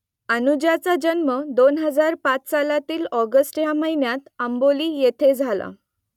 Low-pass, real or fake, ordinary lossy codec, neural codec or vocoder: 19.8 kHz; real; none; none